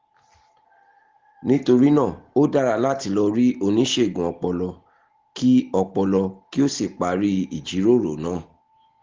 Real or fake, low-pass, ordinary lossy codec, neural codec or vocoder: real; 7.2 kHz; Opus, 16 kbps; none